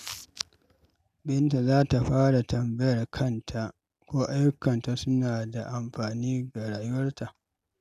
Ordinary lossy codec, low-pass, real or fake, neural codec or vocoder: none; 14.4 kHz; real; none